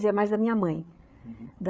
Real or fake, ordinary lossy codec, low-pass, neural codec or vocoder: fake; none; none; codec, 16 kHz, 8 kbps, FreqCodec, larger model